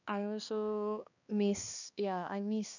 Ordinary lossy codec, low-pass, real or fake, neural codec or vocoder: none; 7.2 kHz; fake; codec, 16 kHz, 2 kbps, X-Codec, HuBERT features, trained on balanced general audio